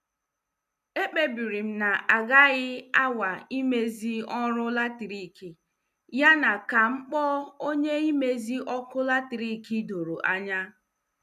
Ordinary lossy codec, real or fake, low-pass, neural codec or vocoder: none; real; 14.4 kHz; none